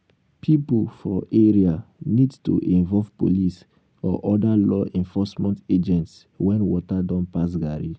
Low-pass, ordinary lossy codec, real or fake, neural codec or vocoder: none; none; real; none